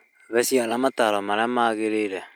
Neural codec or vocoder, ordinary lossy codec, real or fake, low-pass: none; none; real; none